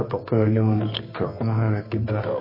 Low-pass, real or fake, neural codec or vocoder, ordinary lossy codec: 5.4 kHz; fake; codec, 24 kHz, 0.9 kbps, WavTokenizer, medium music audio release; MP3, 24 kbps